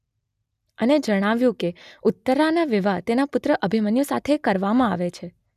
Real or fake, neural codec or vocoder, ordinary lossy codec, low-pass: real; none; none; 14.4 kHz